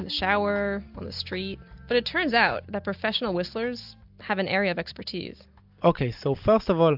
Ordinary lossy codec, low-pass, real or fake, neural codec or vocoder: AAC, 48 kbps; 5.4 kHz; real; none